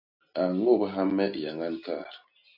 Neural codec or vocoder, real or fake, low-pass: none; real; 5.4 kHz